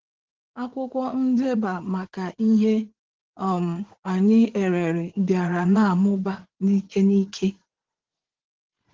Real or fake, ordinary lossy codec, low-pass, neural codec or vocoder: fake; Opus, 16 kbps; 7.2 kHz; vocoder, 44.1 kHz, 80 mel bands, Vocos